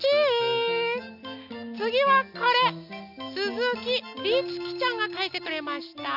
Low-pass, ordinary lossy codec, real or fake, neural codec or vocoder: 5.4 kHz; none; real; none